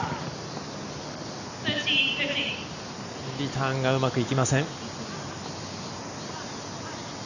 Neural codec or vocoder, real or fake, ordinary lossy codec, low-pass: none; real; none; 7.2 kHz